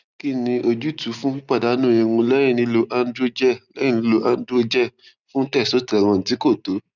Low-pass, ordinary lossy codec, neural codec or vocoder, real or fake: 7.2 kHz; none; none; real